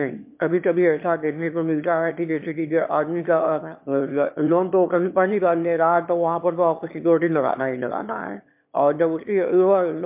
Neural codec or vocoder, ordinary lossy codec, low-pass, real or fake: autoencoder, 22.05 kHz, a latent of 192 numbers a frame, VITS, trained on one speaker; MP3, 32 kbps; 3.6 kHz; fake